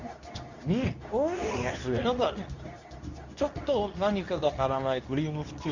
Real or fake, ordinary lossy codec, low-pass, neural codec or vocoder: fake; none; 7.2 kHz; codec, 24 kHz, 0.9 kbps, WavTokenizer, medium speech release version 2